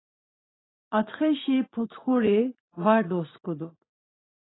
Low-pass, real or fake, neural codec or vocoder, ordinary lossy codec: 7.2 kHz; fake; vocoder, 44.1 kHz, 80 mel bands, Vocos; AAC, 16 kbps